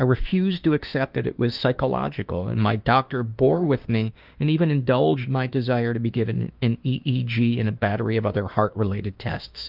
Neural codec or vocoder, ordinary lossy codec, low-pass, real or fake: autoencoder, 48 kHz, 32 numbers a frame, DAC-VAE, trained on Japanese speech; Opus, 32 kbps; 5.4 kHz; fake